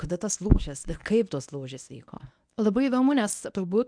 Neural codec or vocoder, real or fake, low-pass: codec, 24 kHz, 0.9 kbps, WavTokenizer, medium speech release version 1; fake; 9.9 kHz